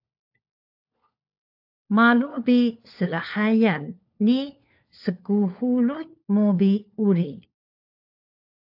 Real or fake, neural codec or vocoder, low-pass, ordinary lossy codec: fake; codec, 16 kHz, 4 kbps, FunCodec, trained on LibriTTS, 50 frames a second; 5.4 kHz; AAC, 48 kbps